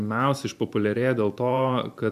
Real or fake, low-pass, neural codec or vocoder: fake; 14.4 kHz; vocoder, 44.1 kHz, 128 mel bands every 512 samples, BigVGAN v2